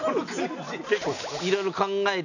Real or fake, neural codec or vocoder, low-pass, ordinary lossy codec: real; none; 7.2 kHz; none